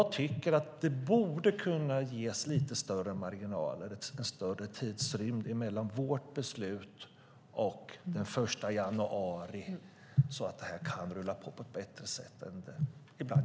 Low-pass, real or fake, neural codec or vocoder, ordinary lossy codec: none; real; none; none